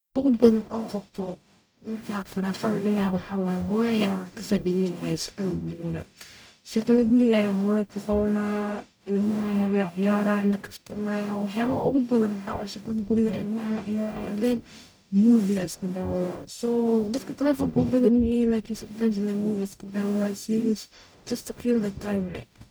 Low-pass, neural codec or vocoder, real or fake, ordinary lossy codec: none; codec, 44.1 kHz, 0.9 kbps, DAC; fake; none